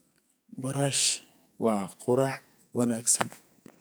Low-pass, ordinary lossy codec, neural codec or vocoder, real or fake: none; none; codec, 44.1 kHz, 2.6 kbps, SNAC; fake